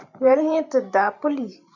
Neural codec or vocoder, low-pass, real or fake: vocoder, 44.1 kHz, 128 mel bands every 512 samples, BigVGAN v2; 7.2 kHz; fake